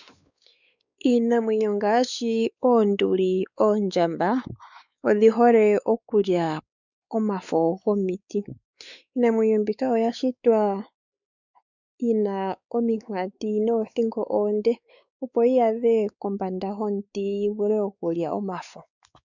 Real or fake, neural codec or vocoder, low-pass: fake; codec, 16 kHz, 4 kbps, X-Codec, WavLM features, trained on Multilingual LibriSpeech; 7.2 kHz